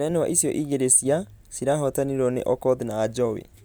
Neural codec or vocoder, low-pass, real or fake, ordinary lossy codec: none; none; real; none